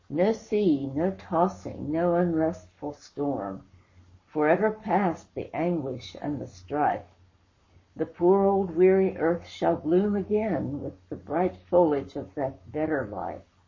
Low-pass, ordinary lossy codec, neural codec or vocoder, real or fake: 7.2 kHz; MP3, 32 kbps; codec, 44.1 kHz, 7.8 kbps, Pupu-Codec; fake